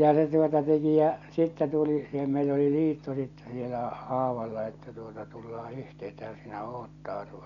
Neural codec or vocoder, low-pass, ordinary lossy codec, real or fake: none; 7.2 kHz; none; real